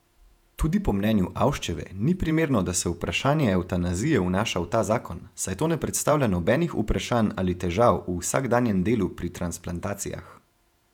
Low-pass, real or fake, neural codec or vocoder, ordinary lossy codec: 19.8 kHz; fake; vocoder, 48 kHz, 128 mel bands, Vocos; none